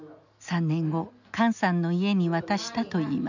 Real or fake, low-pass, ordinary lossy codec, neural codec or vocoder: real; 7.2 kHz; none; none